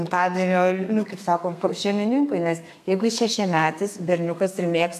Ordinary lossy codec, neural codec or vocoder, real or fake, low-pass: AAC, 96 kbps; codec, 32 kHz, 1.9 kbps, SNAC; fake; 14.4 kHz